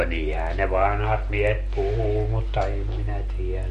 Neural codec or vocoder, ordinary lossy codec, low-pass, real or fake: none; MP3, 48 kbps; 14.4 kHz; real